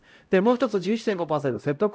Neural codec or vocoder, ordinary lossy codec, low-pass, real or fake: codec, 16 kHz, 0.5 kbps, X-Codec, HuBERT features, trained on LibriSpeech; none; none; fake